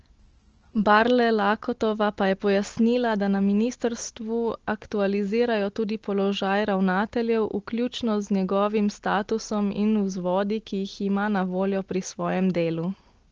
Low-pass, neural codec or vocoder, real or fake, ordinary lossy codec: 7.2 kHz; none; real; Opus, 16 kbps